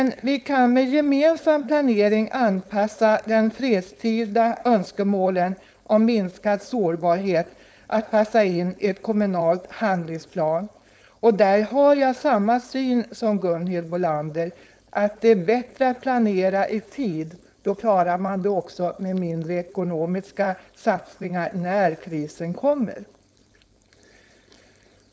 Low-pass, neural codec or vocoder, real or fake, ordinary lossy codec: none; codec, 16 kHz, 4.8 kbps, FACodec; fake; none